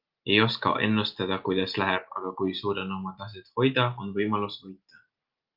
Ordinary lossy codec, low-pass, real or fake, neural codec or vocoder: Opus, 24 kbps; 5.4 kHz; real; none